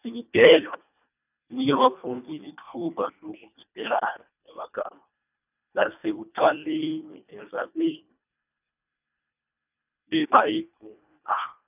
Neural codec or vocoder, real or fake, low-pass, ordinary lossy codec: codec, 24 kHz, 1.5 kbps, HILCodec; fake; 3.6 kHz; none